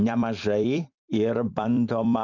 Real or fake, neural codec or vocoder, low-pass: fake; vocoder, 44.1 kHz, 128 mel bands every 256 samples, BigVGAN v2; 7.2 kHz